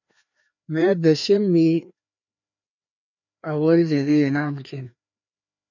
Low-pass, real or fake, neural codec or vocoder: 7.2 kHz; fake; codec, 16 kHz, 2 kbps, FreqCodec, larger model